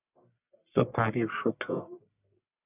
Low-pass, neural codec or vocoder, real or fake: 3.6 kHz; codec, 44.1 kHz, 1.7 kbps, Pupu-Codec; fake